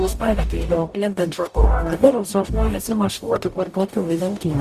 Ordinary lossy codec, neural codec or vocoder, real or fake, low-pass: Opus, 64 kbps; codec, 44.1 kHz, 0.9 kbps, DAC; fake; 14.4 kHz